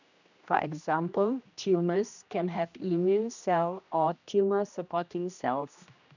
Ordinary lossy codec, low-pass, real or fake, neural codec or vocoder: none; 7.2 kHz; fake; codec, 16 kHz, 1 kbps, X-Codec, HuBERT features, trained on general audio